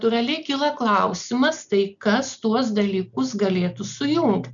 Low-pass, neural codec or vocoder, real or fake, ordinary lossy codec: 7.2 kHz; none; real; MP3, 96 kbps